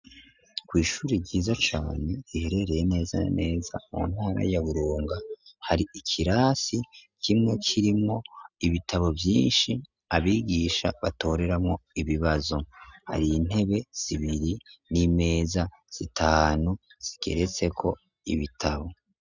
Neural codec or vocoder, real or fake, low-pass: none; real; 7.2 kHz